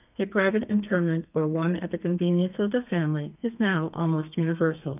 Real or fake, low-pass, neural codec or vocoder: fake; 3.6 kHz; codec, 32 kHz, 1.9 kbps, SNAC